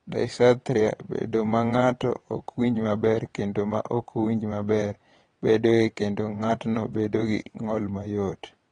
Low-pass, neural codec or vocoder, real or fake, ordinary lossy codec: 9.9 kHz; vocoder, 22.05 kHz, 80 mel bands, WaveNeXt; fake; AAC, 32 kbps